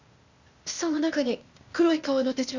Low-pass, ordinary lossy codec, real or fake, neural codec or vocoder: 7.2 kHz; Opus, 64 kbps; fake; codec, 16 kHz, 0.8 kbps, ZipCodec